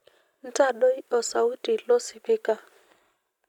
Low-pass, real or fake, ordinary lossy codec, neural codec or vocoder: 19.8 kHz; real; none; none